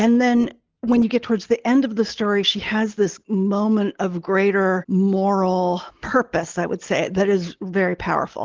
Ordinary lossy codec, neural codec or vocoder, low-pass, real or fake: Opus, 16 kbps; none; 7.2 kHz; real